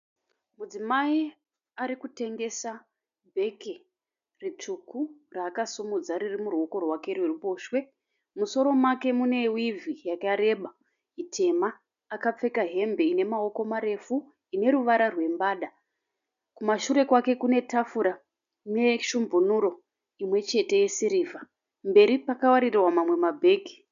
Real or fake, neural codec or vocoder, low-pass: real; none; 7.2 kHz